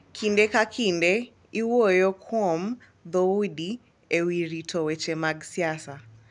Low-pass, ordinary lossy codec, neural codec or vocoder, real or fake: 10.8 kHz; none; none; real